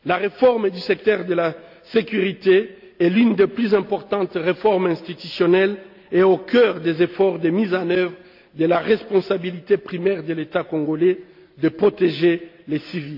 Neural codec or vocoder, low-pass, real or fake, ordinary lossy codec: none; 5.4 kHz; real; none